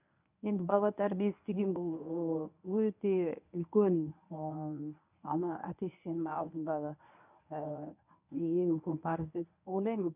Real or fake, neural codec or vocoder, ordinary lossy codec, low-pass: fake; codec, 24 kHz, 0.9 kbps, WavTokenizer, medium speech release version 1; none; 3.6 kHz